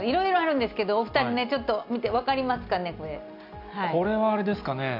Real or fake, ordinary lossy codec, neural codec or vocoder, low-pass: real; none; none; 5.4 kHz